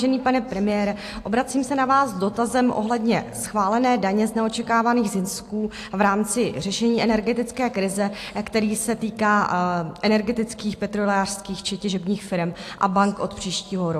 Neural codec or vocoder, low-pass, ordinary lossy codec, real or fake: none; 14.4 kHz; AAC, 64 kbps; real